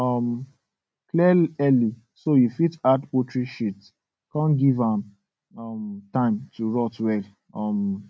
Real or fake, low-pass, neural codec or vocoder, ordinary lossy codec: real; none; none; none